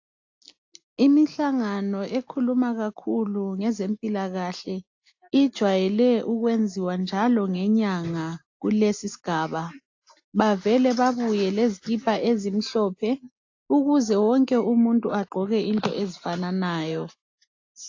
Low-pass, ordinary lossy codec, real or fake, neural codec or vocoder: 7.2 kHz; AAC, 48 kbps; real; none